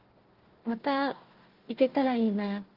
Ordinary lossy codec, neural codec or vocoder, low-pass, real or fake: Opus, 16 kbps; codec, 16 kHz, 1 kbps, FunCodec, trained on Chinese and English, 50 frames a second; 5.4 kHz; fake